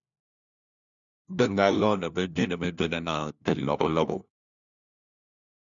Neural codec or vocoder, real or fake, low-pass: codec, 16 kHz, 1 kbps, FunCodec, trained on LibriTTS, 50 frames a second; fake; 7.2 kHz